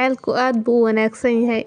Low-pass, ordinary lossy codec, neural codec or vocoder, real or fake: 9.9 kHz; MP3, 96 kbps; none; real